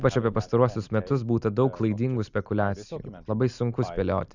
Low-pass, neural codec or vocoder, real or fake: 7.2 kHz; none; real